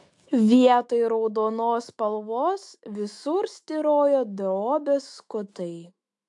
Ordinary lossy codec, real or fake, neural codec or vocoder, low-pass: AAC, 64 kbps; fake; autoencoder, 48 kHz, 128 numbers a frame, DAC-VAE, trained on Japanese speech; 10.8 kHz